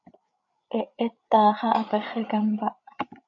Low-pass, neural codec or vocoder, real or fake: 7.2 kHz; codec, 16 kHz, 8 kbps, FreqCodec, larger model; fake